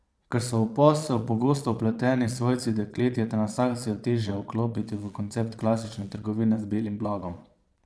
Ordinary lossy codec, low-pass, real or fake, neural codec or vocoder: none; none; fake; vocoder, 22.05 kHz, 80 mel bands, Vocos